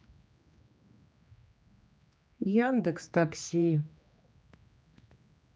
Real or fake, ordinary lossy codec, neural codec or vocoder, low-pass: fake; none; codec, 16 kHz, 2 kbps, X-Codec, HuBERT features, trained on general audio; none